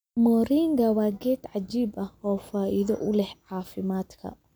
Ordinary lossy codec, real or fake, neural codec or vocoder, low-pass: none; real; none; none